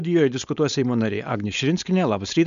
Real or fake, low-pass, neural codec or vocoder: fake; 7.2 kHz; codec, 16 kHz, 4.8 kbps, FACodec